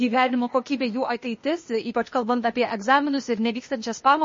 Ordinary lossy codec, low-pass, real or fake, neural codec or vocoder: MP3, 32 kbps; 7.2 kHz; fake; codec, 16 kHz, 0.8 kbps, ZipCodec